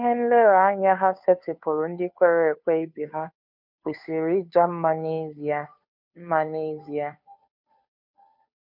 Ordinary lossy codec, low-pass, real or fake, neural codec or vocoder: MP3, 48 kbps; 5.4 kHz; fake; codec, 16 kHz, 2 kbps, FunCodec, trained on Chinese and English, 25 frames a second